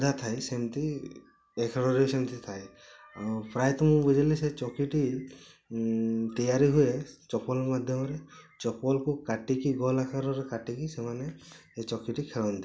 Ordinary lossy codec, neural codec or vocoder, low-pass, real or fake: none; none; none; real